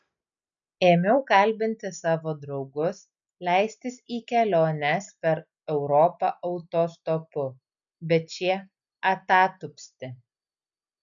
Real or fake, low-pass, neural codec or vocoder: real; 7.2 kHz; none